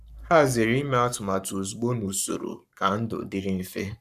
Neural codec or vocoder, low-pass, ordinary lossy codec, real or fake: codec, 44.1 kHz, 7.8 kbps, Pupu-Codec; 14.4 kHz; none; fake